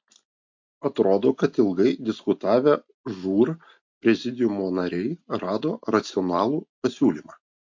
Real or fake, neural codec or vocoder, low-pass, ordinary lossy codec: real; none; 7.2 kHz; MP3, 48 kbps